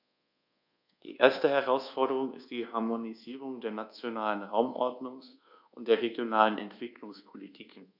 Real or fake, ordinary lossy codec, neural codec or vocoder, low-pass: fake; none; codec, 24 kHz, 1.2 kbps, DualCodec; 5.4 kHz